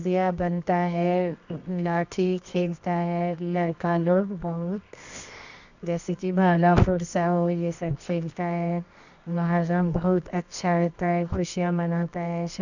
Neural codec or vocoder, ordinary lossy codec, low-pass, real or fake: codec, 24 kHz, 0.9 kbps, WavTokenizer, medium music audio release; none; 7.2 kHz; fake